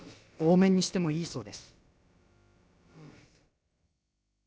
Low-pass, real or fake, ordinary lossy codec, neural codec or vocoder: none; fake; none; codec, 16 kHz, about 1 kbps, DyCAST, with the encoder's durations